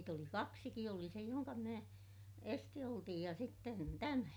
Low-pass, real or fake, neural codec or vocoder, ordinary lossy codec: none; real; none; none